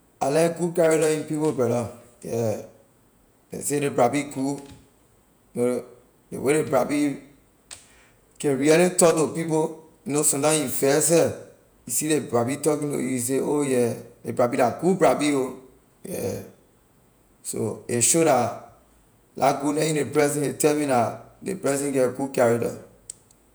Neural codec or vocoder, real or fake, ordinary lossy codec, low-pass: vocoder, 48 kHz, 128 mel bands, Vocos; fake; none; none